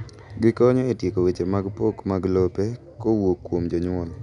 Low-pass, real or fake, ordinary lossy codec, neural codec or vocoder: 10.8 kHz; real; none; none